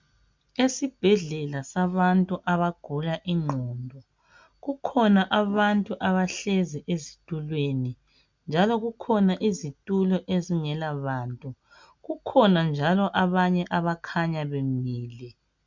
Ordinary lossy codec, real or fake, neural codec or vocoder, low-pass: MP3, 64 kbps; real; none; 7.2 kHz